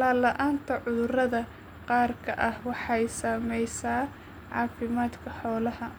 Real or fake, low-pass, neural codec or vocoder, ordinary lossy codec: real; none; none; none